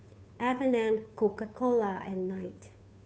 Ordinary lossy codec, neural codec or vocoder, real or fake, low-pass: none; codec, 16 kHz, 2 kbps, FunCodec, trained on Chinese and English, 25 frames a second; fake; none